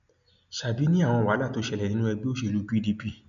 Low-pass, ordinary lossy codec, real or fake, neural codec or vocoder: 7.2 kHz; none; real; none